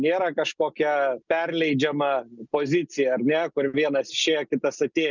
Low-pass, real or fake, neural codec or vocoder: 7.2 kHz; real; none